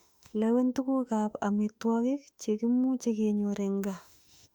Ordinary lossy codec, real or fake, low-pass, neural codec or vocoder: Opus, 64 kbps; fake; 19.8 kHz; autoencoder, 48 kHz, 32 numbers a frame, DAC-VAE, trained on Japanese speech